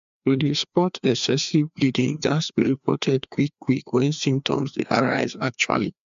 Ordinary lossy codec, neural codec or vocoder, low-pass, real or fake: MP3, 64 kbps; codec, 16 kHz, 2 kbps, FreqCodec, larger model; 7.2 kHz; fake